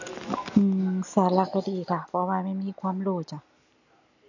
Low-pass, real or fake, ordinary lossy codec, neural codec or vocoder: 7.2 kHz; real; none; none